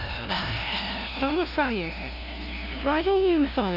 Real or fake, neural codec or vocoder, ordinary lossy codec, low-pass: fake; codec, 16 kHz, 0.5 kbps, FunCodec, trained on LibriTTS, 25 frames a second; none; 5.4 kHz